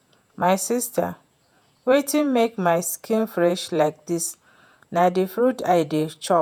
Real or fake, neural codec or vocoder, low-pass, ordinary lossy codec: fake; vocoder, 48 kHz, 128 mel bands, Vocos; none; none